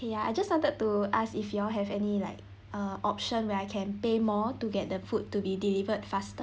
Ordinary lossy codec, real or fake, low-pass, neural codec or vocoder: none; real; none; none